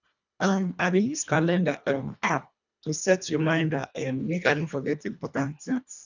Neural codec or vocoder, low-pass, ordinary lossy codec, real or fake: codec, 24 kHz, 1.5 kbps, HILCodec; 7.2 kHz; none; fake